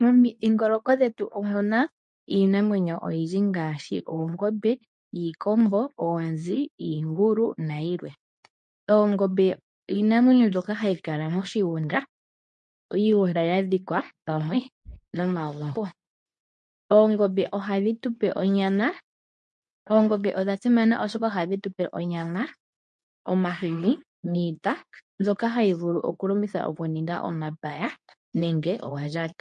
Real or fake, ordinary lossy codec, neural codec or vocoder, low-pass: fake; MP3, 48 kbps; codec, 24 kHz, 0.9 kbps, WavTokenizer, medium speech release version 2; 10.8 kHz